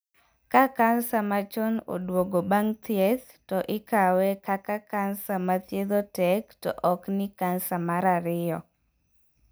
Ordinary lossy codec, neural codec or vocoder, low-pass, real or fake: none; none; none; real